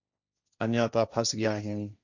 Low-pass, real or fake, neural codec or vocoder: 7.2 kHz; fake; codec, 16 kHz, 1.1 kbps, Voila-Tokenizer